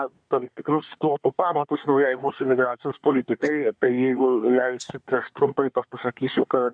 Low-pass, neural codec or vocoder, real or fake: 9.9 kHz; codec, 24 kHz, 1 kbps, SNAC; fake